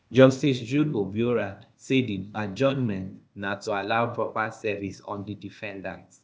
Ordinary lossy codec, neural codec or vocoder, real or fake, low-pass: none; codec, 16 kHz, 0.8 kbps, ZipCodec; fake; none